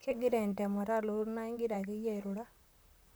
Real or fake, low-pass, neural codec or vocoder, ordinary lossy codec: fake; none; vocoder, 44.1 kHz, 128 mel bands, Pupu-Vocoder; none